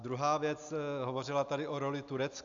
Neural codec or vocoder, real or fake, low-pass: none; real; 7.2 kHz